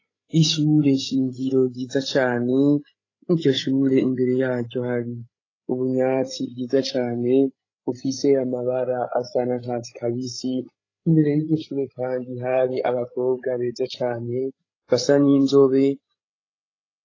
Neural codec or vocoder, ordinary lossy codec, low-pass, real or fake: codec, 16 kHz, 8 kbps, FreqCodec, larger model; AAC, 32 kbps; 7.2 kHz; fake